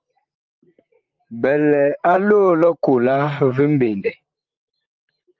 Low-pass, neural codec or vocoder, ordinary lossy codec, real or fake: 7.2 kHz; vocoder, 44.1 kHz, 128 mel bands, Pupu-Vocoder; Opus, 24 kbps; fake